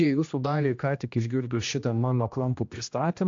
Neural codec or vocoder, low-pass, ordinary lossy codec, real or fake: codec, 16 kHz, 1 kbps, X-Codec, HuBERT features, trained on general audio; 7.2 kHz; AAC, 48 kbps; fake